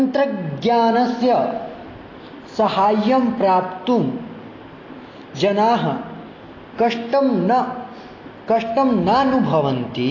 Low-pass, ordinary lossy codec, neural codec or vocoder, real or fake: 7.2 kHz; AAC, 32 kbps; none; real